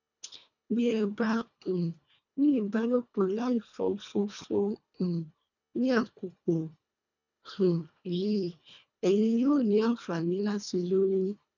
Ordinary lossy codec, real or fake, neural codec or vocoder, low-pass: none; fake; codec, 24 kHz, 1.5 kbps, HILCodec; 7.2 kHz